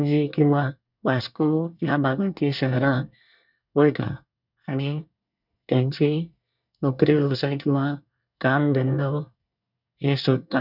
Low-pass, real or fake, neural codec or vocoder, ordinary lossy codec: 5.4 kHz; fake; codec, 24 kHz, 1 kbps, SNAC; none